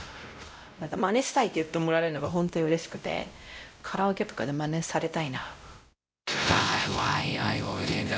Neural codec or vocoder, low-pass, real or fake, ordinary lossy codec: codec, 16 kHz, 0.5 kbps, X-Codec, WavLM features, trained on Multilingual LibriSpeech; none; fake; none